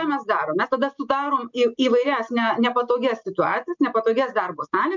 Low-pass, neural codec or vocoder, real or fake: 7.2 kHz; none; real